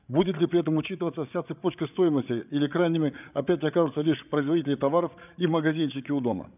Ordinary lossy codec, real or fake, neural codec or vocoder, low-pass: none; fake; codec, 16 kHz, 8 kbps, FreqCodec, larger model; 3.6 kHz